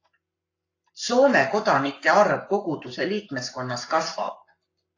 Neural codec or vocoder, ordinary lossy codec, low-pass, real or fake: codec, 44.1 kHz, 7.8 kbps, Pupu-Codec; AAC, 48 kbps; 7.2 kHz; fake